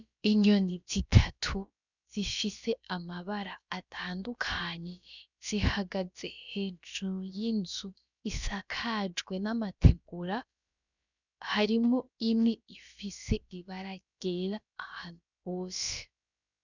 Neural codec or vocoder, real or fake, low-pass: codec, 16 kHz, about 1 kbps, DyCAST, with the encoder's durations; fake; 7.2 kHz